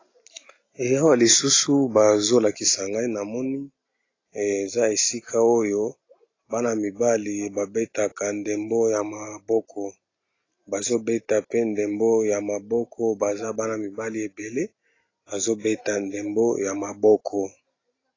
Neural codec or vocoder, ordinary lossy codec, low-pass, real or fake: none; AAC, 32 kbps; 7.2 kHz; real